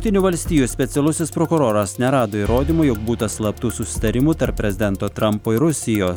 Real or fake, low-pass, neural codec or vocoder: real; 19.8 kHz; none